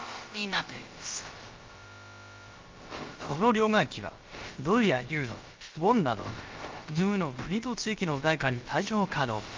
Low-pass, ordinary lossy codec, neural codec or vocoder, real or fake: 7.2 kHz; Opus, 24 kbps; codec, 16 kHz, about 1 kbps, DyCAST, with the encoder's durations; fake